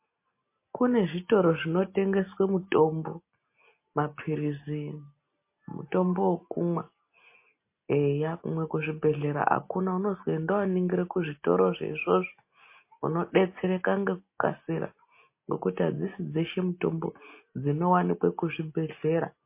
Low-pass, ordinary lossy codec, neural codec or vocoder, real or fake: 3.6 kHz; MP3, 24 kbps; none; real